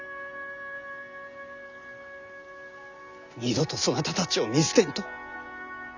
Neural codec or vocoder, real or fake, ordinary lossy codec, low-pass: none; real; Opus, 64 kbps; 7.2 kHz